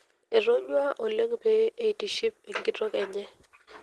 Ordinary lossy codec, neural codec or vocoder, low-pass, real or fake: Opus, 16 kbps; none; 10.8 kHz; real